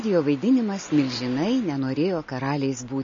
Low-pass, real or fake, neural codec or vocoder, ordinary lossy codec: 7.2 kHz; real; none; MP3, 32 kbps